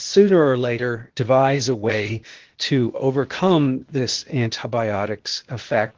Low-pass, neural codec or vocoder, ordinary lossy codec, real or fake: 7.2 kHz; codec, 16 kHz, 0.8 kbps, ZipCodec; Opus, 16 kbps; fake